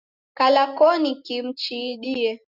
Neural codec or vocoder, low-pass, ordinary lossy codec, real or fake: none; 5.4 kHz; Opus, 64 kbps; real